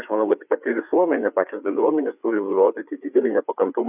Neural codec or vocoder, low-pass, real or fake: codec, 16 kHz, 4 kbps, FreqCodec, larger model; 3.6 kHz; fake